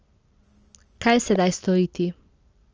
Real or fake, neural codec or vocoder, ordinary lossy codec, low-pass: real; none; Opus, 24 kbps; 7.2 kHz